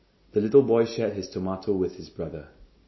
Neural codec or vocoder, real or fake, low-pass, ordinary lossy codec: none; real; 7.2 kHz; MP3, 24 kbps